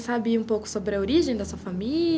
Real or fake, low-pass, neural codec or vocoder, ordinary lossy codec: real; none; none; none